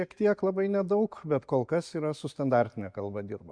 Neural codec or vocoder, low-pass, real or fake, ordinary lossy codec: none; 9.9 kHz; real; MP3, 64 kbps